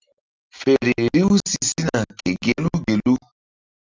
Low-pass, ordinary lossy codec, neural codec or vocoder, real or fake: 7.2 kHz; Opus, 32 kbps; none; real